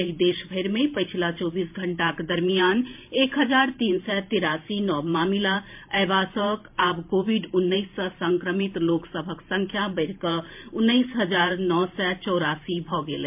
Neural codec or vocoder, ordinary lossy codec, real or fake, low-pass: vocoder, 44.1 kHz, 128 mel bands every 512 samples, BigVGAN v2; MP3, 32 kbps; fake; 3.6 kHz